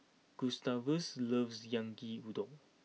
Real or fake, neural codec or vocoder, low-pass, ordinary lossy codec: real; none; none; none